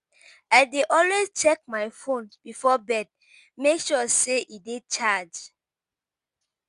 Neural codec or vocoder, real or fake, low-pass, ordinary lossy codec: none; real; 10.8 kHz; Opus, 64 kbps